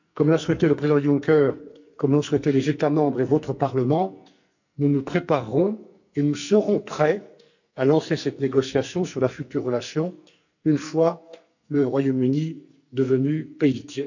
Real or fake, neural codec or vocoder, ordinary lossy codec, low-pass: fake; codec, 44.1 kHz, 2.6 kbps, SNAC; none; 7.2 kHz